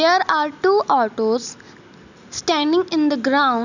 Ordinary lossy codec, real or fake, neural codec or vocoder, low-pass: none; real; none; 7.2 kHz